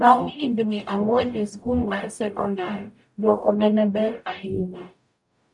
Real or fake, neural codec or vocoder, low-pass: fake; codec, 44.1 kHz, 0.9 kbps, DAC; 10.8 kHz